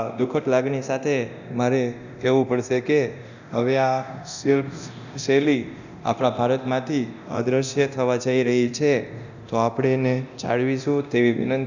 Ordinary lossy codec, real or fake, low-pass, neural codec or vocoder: none; fake; 7.2 kHz; codec, 24 kHz, 0.9 kbps, DualCodec